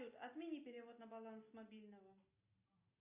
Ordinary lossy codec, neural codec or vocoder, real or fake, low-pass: AAC, 32 kbps; none; real; 3.6 kHz